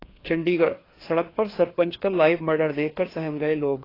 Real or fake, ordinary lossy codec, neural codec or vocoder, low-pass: fake; AAC, 24 kbps; codec, 16 kHz, 2 kbps, FreqCodec, larger model; 5.4 kHz